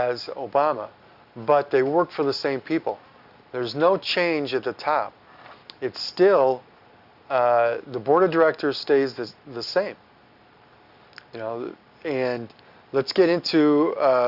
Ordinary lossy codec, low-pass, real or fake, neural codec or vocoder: Opus, 64 kbps; 5.4 kHz; real; none